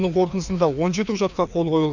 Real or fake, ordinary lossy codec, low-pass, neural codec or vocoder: fake; none; 7.2 kHz; codec, 16 kHz, 2 kbps, FreqCodec, larger model